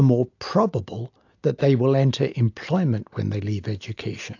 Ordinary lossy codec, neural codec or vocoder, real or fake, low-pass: AAC, 48 kbps; none; real; 7.2 kHz